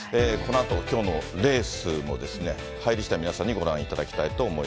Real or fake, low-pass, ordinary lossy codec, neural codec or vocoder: real; none; none; none